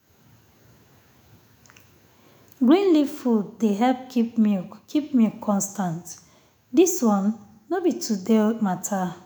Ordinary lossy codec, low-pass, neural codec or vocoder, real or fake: none; none; autoencoder, 48 kHz, 128 numbers a frame, DAC-VAE, trained on Japanese speech; fake